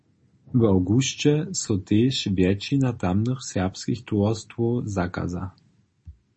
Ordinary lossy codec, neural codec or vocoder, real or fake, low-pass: MP3, 32 kbps; none; real; 10.8 kHz